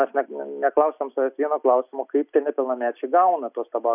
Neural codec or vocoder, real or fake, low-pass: none; real; 3.6 kHz